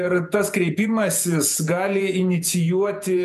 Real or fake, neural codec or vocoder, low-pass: fake; vocoder, 48 kHz, 128 mel bands, Vocos; 14.4 kHz